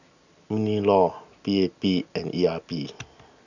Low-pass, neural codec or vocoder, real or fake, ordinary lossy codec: 7.2 kHz; none; real; none